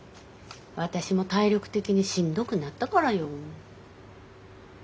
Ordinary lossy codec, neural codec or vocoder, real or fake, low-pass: none; none; real; none